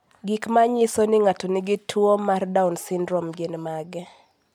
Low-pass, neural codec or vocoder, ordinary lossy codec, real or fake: 19.8 kHz; none; MP3, 96 kbps; real